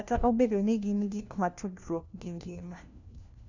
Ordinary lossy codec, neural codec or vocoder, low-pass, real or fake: none; codec, 16 kHz, 1 kbps, FunCodec, trained on LibriTTS, 50 frames a second; 7.2 kHz; fake